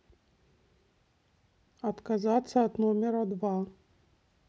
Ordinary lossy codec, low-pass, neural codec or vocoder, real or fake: none; none; none; real